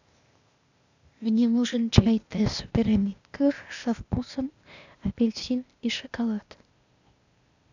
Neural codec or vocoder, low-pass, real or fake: codec, 16 kHz, 0.8 kbps, ZipCodec; 7.2 kHz; fake